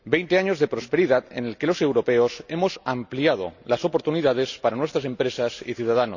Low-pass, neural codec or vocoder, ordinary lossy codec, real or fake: 7.2 kHz; none; none; real